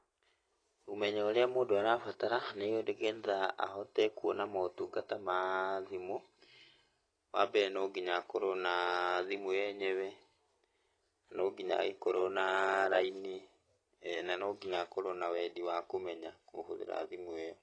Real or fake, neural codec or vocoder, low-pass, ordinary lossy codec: real; none; 9.9 kHz; AAC, 32 kbps